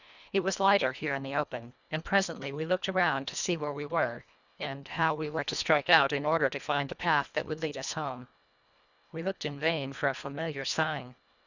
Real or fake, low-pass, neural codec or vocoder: fake; 7.2 kHz; codec, 24 kHz, 1.5 kbps, HILCodec